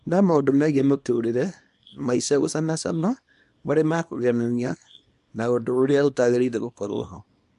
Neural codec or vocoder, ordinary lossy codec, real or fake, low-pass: codec, 24 kHz, 0.9 kbps, WavTokenizer, small release; MP3, 64 kbps; fake; 10.8 kHz